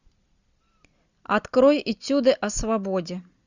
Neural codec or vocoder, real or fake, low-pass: none; real; 7.2 kHz